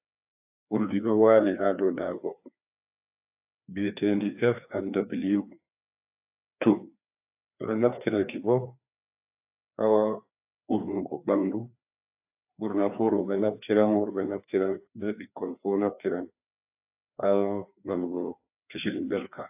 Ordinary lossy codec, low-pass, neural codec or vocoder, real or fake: AAC, 32 kbps; 3.6 kHz; codec, 16 kHz, 2 kbps, FreqCodec, larger model; fake